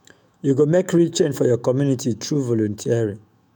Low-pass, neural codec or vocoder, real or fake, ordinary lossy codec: none; autoencoder, 48 kHz, 128 numbers a frame, DAC-VAE, trained on Japanese speech; fake; none